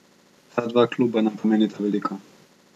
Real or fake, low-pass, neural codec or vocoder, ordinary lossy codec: real; 14.4 kHz; none; none